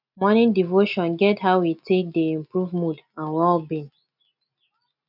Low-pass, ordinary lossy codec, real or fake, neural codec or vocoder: 5.4 kHz; none; real; none